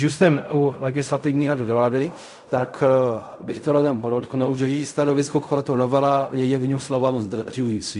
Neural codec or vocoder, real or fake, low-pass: codec, 16 kHz in and 24 kHz out, 0.4 kbps, LongCat-Audio-Codec, fine tuned four codebook decoder; fake; 10.8 kHz